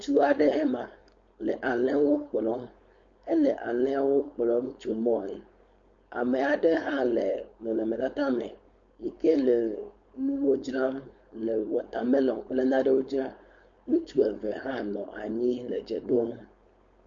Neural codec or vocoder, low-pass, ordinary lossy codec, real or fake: codec, 16 kHz, 4.8 kbps, FACodec; 7.2 kHz; MP3, 48 kbps; fake